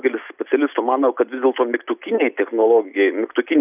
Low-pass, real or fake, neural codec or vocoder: 3.6 kHz; real; none